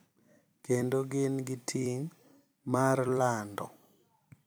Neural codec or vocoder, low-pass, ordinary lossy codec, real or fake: vocoder, 44.1 kHz, 128 mel bands every 256 samples, BigVGAN v2; none; none; fake